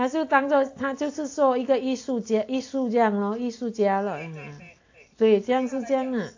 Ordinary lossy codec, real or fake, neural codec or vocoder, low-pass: AAC, 48 kbps; real; none; 7.2 kHz